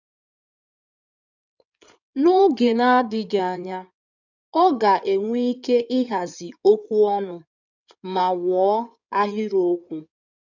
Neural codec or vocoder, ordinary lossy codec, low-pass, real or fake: codec, 16 kHz in and 24 kHz out, 2.2 kbps, FireRedTTS-2 codec; none; 7.2 kHz; fake